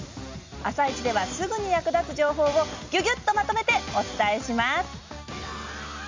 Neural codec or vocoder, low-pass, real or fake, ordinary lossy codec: none; 7.2 kHz; real; MP3, 48 kbps